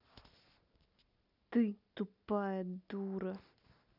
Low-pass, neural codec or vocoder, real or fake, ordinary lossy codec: 5.4 kHz; none; real; none